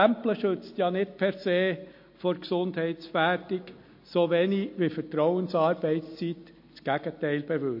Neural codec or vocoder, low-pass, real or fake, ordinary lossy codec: none; 5.4 kHz; real; MP3, 32 kbps